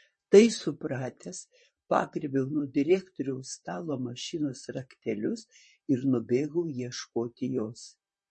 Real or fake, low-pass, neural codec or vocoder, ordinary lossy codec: fake; 10.8 kHz; vocoder, 44.1 kHz, 128 mel bands, Pupu-Vocoder; MP3, 32 kbps